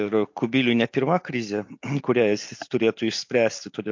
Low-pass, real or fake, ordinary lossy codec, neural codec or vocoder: 7.2 kHz; real; MP3, 48 kbps; none